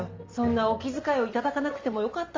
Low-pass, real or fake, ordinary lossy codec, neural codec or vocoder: 7.2 kHz; real; Opus, 32 kbps; none